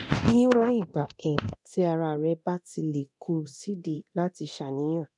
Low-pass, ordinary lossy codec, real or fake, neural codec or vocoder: 10.8 kHz; none; fake; codec, 24 kHz, 0.9 kbps, DualCodec